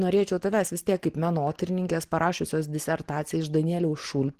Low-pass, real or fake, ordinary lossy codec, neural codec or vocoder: 14.4 kHz; real; Opus, 16 kbps; none